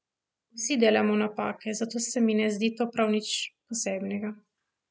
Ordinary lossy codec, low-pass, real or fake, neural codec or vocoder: none; none; real; none